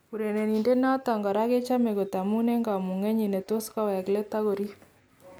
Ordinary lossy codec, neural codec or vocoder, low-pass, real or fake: none; none; none; real